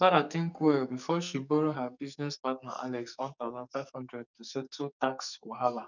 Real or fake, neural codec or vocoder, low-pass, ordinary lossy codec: fake; codec, 44.1 kHz, 2.6 kbps, SNAC; 7.2 kHz; none